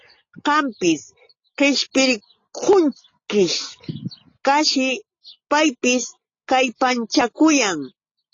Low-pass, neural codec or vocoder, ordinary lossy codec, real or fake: 7.2 kHz; none; AAC, 32 kbps; real